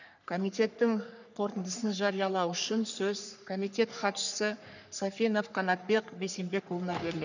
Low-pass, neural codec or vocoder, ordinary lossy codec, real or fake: 7.2 kHz; codec, 44.1 kHz, 3.4 kbps, Pupu-Codec; none; fake